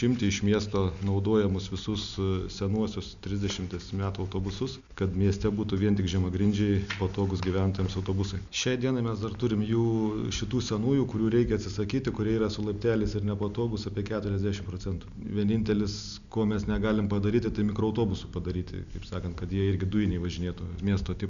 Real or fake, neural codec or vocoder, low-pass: real; none; 7.2 kHz